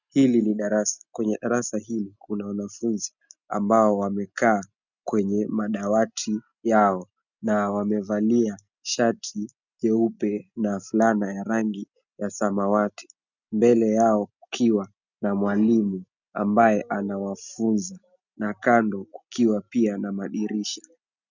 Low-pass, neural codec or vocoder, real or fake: 7.2 kHz; none; real